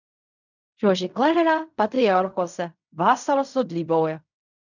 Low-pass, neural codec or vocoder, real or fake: 7.2 kHz; codec, 16 kHz in and 24 kHz out, 0.4 kbps, LongCat-Audio-Codec, fine tuned four codebook decoder; fake